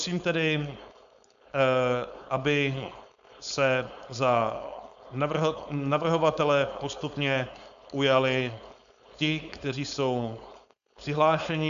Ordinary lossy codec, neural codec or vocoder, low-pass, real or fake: AAC, 96 kbps; codec, 16 kHz, 4.8 kbps, FACodec; 7.2 kHz; fake